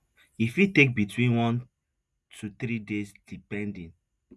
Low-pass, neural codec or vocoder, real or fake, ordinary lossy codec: none; none; real; none